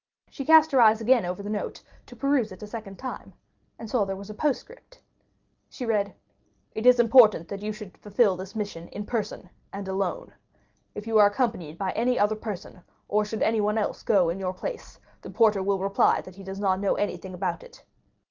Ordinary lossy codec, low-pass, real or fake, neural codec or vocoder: Opus, 24 kbps; 7.2 kHz; real; none